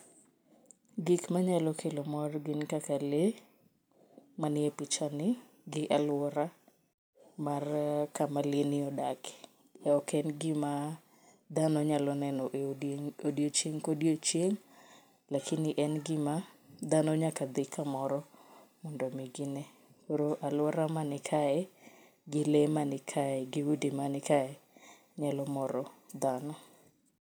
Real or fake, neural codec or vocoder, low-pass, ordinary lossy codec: real; none; none; none